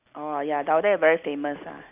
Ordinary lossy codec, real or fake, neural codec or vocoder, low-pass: none; real; none; 3.6 kHz